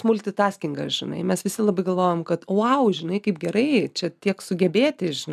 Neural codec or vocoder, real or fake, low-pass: none; real; 14.4 kHz